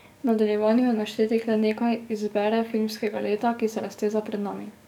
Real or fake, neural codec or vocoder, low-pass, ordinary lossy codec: fake; codec, 44.1 kHz, 7.8 kbps, DAC; 19.8 kHz; none